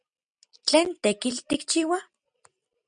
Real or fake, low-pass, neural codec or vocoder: real; 9.9 kHz; none